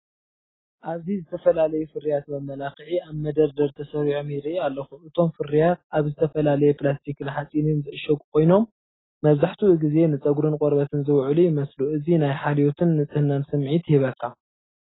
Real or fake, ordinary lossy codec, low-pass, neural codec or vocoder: real; AAC, 16 kbps; 7.2 kHz; none